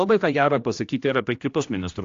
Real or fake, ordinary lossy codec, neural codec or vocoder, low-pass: fake; AAC, 48 kbps; codec, 16 kHz, 1 kbps, X-Codec, HuBERT features, trained on general audio; 7.2 kHz